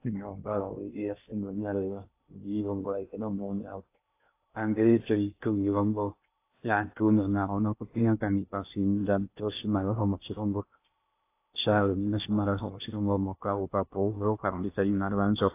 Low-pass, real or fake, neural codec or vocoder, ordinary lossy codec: 3.6 kHz; fake; codec, 16 kHz in and 24 kHz out, 0.6 kbps, FocalCodec, streaming, 2048 codes; AAC, 24 kbps